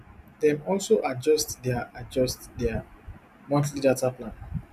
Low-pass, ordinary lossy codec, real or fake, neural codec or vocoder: 14.4 kHz; none; real; none